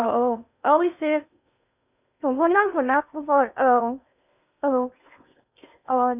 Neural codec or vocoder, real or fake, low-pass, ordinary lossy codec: codec, 16 kHz in and 24 kHz out, 0.8 kbps, FocalCodec, streaming, 65536 codes; fake; 3.6 kHz; none